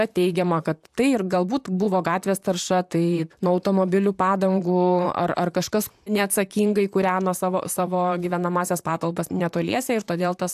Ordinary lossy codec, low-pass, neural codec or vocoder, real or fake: AAC, 96 kbps; 14.4 kHz; vocoder, 44.1 kHz, 128 mel bands, Pupu-Vocoder; fake